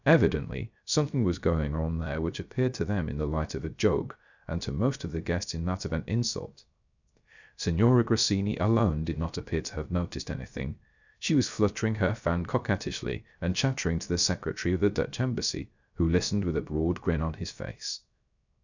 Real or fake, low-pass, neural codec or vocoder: fake; 7.2 kHz; codec, 16 kHz, 0.3 kbps, FocalCodec